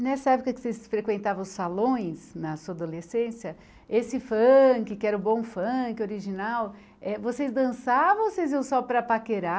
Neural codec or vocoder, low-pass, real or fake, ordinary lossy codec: none; none; real; none